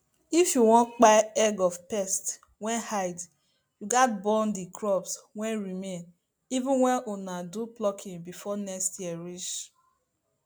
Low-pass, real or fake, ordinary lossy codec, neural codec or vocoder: none; real; none; none